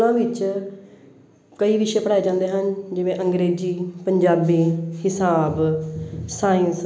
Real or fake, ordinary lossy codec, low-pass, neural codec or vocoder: real; none; none; none